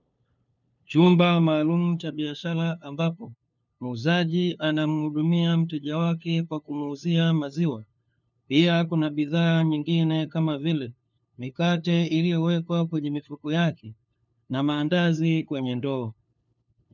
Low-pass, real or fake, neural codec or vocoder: 7.2 kHz; fake; codec, 16 kHz, 2 kbps, FunCodec, trained on LibriTTS, 25 frames a second